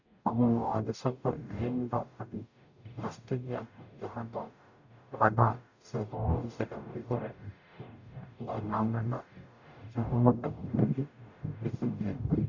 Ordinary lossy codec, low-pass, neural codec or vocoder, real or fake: none; 7.2 kHz; codec, 44.1 kHz, 0.9 kbps, DAC; fake